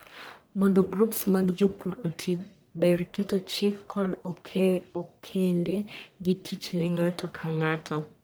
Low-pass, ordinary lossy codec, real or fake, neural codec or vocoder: none; none; fake; codec, 44.1 kHz, 1.7 kbps, Pupu-Codec